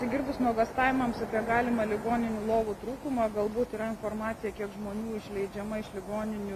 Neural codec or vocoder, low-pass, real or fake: none; 14.4 kHz; real